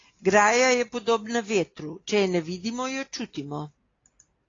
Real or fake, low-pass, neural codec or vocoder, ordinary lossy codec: real; 7.2 kHz; none; AAC, 32 kbps